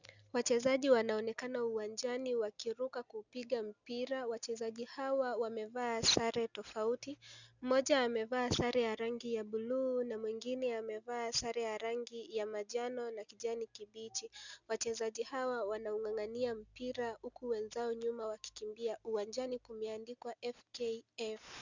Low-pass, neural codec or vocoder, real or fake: 7.2 kHz; none; real